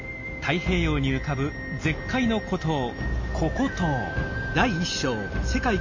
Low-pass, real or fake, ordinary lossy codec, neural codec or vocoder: 7.2 kHz; real; MP3, 48 kbps; none